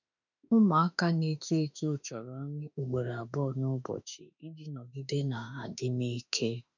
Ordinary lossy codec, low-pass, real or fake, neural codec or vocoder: none; 7.2 kHz; fake; autoencoder, 48 kHz, 32 numbers a frame, DAC-VAE, trained on Japanese speech